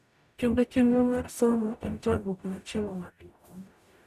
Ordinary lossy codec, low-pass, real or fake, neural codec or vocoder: none; 14.4 kHz; fake; codec, 44.1 kHz, 0.9 kbps, DAC